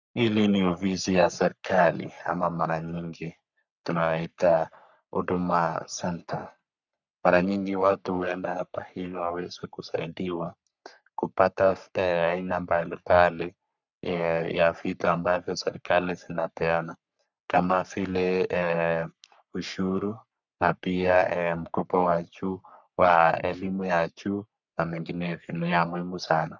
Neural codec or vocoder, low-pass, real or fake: codec, 44.1 kHz, 3.4 kbps, Pupu-Codec; 7.2 kHz; fake